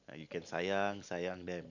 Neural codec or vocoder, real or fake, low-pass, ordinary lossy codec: none; real; 7.2 kHz; none